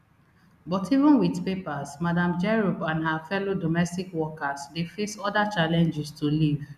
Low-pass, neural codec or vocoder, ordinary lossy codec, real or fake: 14.4 kHz; none; none; real